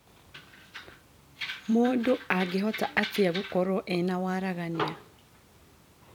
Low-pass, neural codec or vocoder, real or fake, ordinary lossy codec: 19.8 kHz; vocoder, 44.1 kHz, 128 mel bands every 256 samples, BigVGAN v2; fake; none